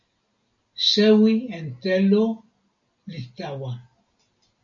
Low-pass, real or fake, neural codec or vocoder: 7.2 kHz; real; none